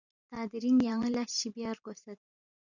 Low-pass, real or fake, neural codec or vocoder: 7.2 kHz; fake; vocoder, 44.1 kHz, 128 mel bands every 512 samples, BigVGAN v2